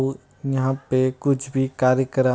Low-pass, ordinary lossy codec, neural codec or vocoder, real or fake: none; none; none; real